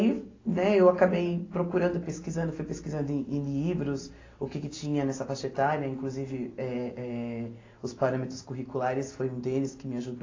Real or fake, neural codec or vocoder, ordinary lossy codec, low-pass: real; none; AAC, 32 kbps; 7.2 kHz